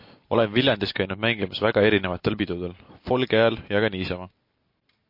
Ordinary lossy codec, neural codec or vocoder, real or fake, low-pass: MP3, 32 kbps; none; real; 5.4 kHz